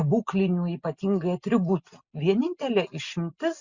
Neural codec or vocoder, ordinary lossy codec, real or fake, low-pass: none; Opus, 64 kbps; real; 7.2 kHz